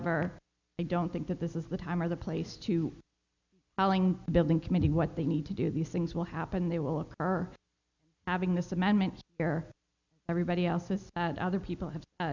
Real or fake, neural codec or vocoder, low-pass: real; none; 7.2 kHz